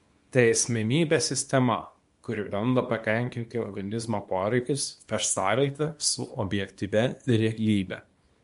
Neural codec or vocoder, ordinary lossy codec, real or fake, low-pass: codec, 24 kHz, 0.9 kbps, WavTokenizer, small release; MP3, 64 kbps; fake; 10.8 kHz